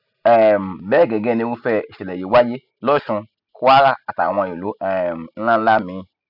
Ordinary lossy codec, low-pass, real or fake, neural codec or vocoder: none; 5.4 kHz; real; none